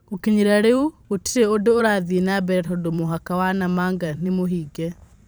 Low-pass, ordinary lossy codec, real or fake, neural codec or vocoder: none; none; fake; vocoder, 44.1 kHz, 128 mel bands every 512 samples, BigVGAN v2